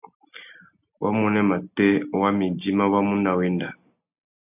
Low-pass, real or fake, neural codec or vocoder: 3.6 kHz; real; none